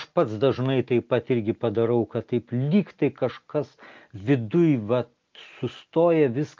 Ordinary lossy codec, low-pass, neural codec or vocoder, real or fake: Opus, 24 kbps; 7.2 kHz; none; real